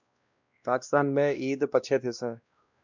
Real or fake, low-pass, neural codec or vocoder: fake; 7.2 kHz; codec, 16 kHz, 1 kbps, X-Codec, WavLM features, trained on Multilingual LibriSpeech